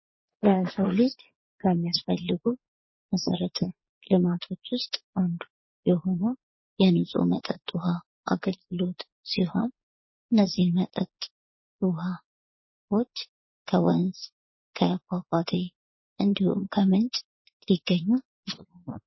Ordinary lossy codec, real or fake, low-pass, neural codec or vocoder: MP3, 24 kbps; fake; 7.2 kHz; vocoder, 22.05 kHz, 80 mel bands, Vocos